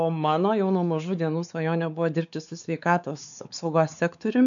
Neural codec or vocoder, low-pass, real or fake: codec, 16 kHz, 4 kbps, X-Codec, WavLM features, trained on Multilingual LibriSpeech; 7.2 kHz; fake